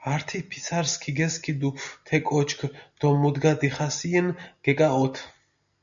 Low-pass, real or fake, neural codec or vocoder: 7.2 kHz; real; none